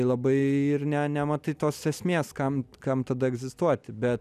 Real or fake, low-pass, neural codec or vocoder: real; 14.4 kHz; none